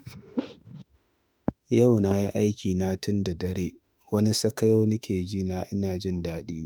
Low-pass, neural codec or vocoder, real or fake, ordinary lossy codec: none; autoencoder, 48 kHz, 32 numbers a frame, DAC-VAE, trained on Japanese speech; fake; none